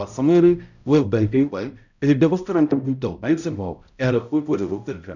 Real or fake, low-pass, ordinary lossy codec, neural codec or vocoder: fake; 7.2 kHz; none; codec, 16 kHz, 0.5 kbps, X-Codec, HuBERT features, trained on balanced general audio